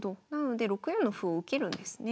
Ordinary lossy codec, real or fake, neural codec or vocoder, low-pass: none; real; none; none